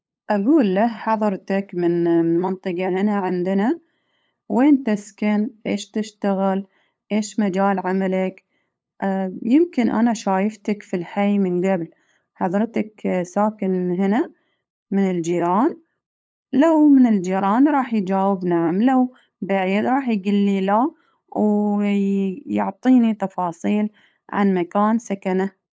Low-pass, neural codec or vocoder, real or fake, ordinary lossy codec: none; codec, 16 kHz, 8 kbps, FunCodec, trained on LibriTTS, 25 frames a second; fake; none